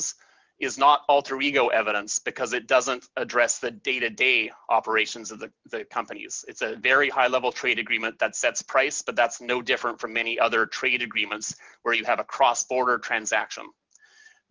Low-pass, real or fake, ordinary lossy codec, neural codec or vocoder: 7.2 kHz; real; Opus, 32 kbps; none